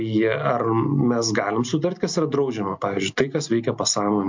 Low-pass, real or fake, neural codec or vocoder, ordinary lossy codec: 7.2 kHz; real; none; MP3, 64 kbps